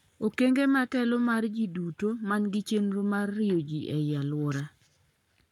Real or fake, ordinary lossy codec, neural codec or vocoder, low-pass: fake; none; codec, 44.1 kHz, 7.8 kbps, Pupu-Codec; 19.8 kHz